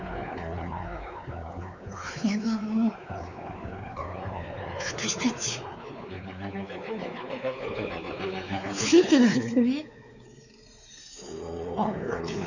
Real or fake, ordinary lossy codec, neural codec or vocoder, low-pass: fake; none; codec, 16 kHz, 4 kbps, X-Codec, WavLM features, trained on Multilingual LibriSpeech; 7.2 kHz